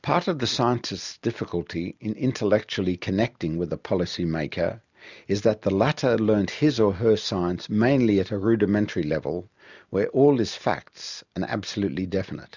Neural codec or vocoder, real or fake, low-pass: none; real; 7.2 kHz